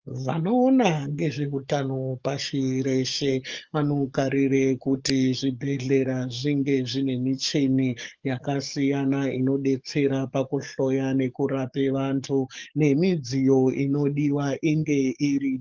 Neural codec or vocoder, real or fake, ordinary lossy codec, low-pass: codec, 44.1 kHz, 7.8 kbps, DAC; fake; Opus, 24 kbps; 7.2 kHz